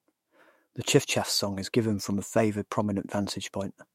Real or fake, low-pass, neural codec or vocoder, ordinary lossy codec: fake; 19.8 kHz; autoencoder, 48 kHz, 128 numbers a frame, DAC-VAE, trained on Japanese speech; MP3, 64 kbps